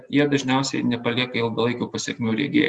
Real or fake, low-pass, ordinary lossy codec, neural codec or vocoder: real; 10.8 kHz; Opus, 64 kbps; none